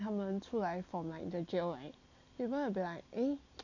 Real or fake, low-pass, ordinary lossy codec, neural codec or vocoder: real; 7.2 kHz; none; none